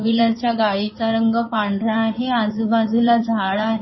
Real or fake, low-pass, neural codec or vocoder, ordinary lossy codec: fake; 7.2 kHz; codec, 16 kHz in and 24 kHz out, 2.2 kbps, FireRedTTS-2 codec; MP3, 24 kbps